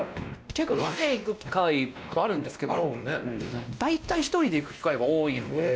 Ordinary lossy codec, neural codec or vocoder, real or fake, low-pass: none; codec, 16 kHz, 1 kbps, X-Codec, WavLM features, trained on Multilingual LibriSpeech; fake; none